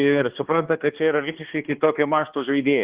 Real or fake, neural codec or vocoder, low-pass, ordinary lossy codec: fake; codec, 16 kHz, 2 kbps, X-Codec, HuBERT features, trained on balanced general audio; 3.6 kHz; Opus, 16 kbps